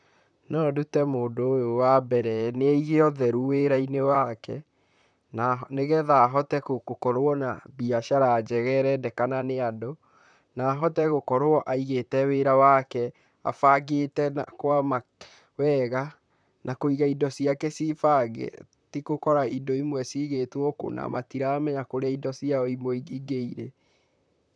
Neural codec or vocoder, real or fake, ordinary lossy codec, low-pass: vocoder, 44.1 kHz, 128 mel bands, Pupu-Vocoder; fake; none; 9.9 kHz